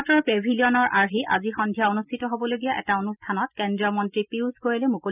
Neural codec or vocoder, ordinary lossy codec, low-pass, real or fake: none; none; 3.6 kHz; real